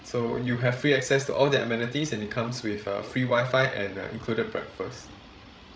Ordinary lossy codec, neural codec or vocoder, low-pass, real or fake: none; codec, 16 kHz, 16 kbps, FreqCodec, larger model; none; fake